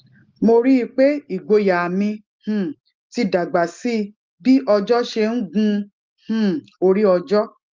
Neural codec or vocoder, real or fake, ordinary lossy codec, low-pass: none; real; Opus, 32 kbps; 7.2 kHz